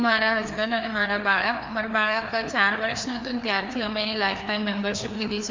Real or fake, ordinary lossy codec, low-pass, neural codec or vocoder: fake; MP3, 64 kbps; 7.2 kHz; codec, 16 kHz, 2 kbps, FreqCodec, larger model